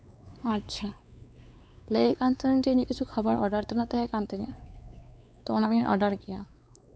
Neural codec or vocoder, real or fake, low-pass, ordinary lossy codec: codec, 16 kHz, 4 kbps, X-Codec, WavLM features, trained on Multilingual LibriSpeech; fake; none; none